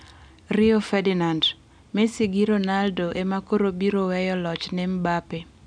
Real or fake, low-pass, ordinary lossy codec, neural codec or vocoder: real; 9.9 kHz; none; none